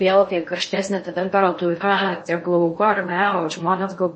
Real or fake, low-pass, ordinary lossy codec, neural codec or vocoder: fake; 9.9 kHz; MP3, 32 kbps; codec, 16 kHz in and 24 kHz out, 0.6 kbps, FocalCodec, streaming, 4096 codes